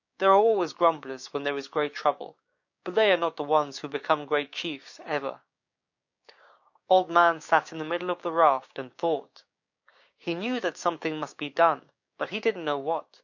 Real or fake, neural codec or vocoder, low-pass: fake; codec, 16 kHz, 6 kbps, DAC; 7.2 kHz